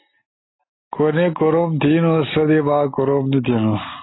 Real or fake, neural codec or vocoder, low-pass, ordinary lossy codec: real; none; 7.2 kHz; AAC, 16 kbps